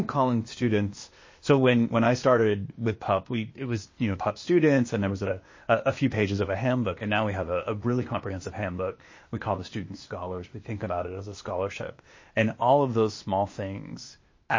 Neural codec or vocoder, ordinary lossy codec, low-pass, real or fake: codec, 16 kHz, 0.8 kbps, ZipCodec; MP3, 32 kbps; 7.2 kHz; fake